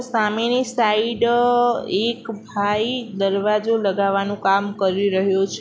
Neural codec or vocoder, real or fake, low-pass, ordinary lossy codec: none; real; none; none